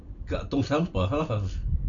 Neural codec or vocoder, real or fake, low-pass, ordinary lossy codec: none; real; 7.2 kHz; none